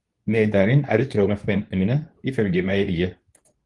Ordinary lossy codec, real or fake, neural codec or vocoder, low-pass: Opus, 24 kbps; fake; codec, 24 kHz, 0.9 kbps, WavTokenizer, medium speech release version 2; 10.8 kHz